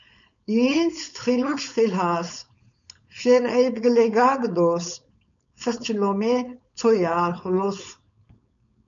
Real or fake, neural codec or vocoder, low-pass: fake; codec, 16 kHz, 4.8 kbps, FACodec; 7.2 kHz